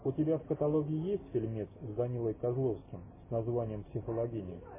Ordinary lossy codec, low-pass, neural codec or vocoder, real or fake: MP3, 16 kbps; 3.6 kHz; none; real